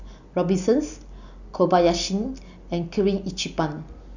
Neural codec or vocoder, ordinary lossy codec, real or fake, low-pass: none; none; real; 7.2 kHz